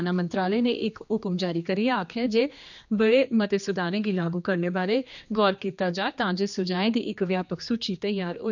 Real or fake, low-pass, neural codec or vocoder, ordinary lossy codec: fake; 7.2 kHz; codec, 16 kHz, 2 kbps, X-Codec, HuBERT features, trained on general audio; none